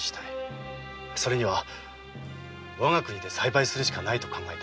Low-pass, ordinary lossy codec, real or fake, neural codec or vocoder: none; none; real; none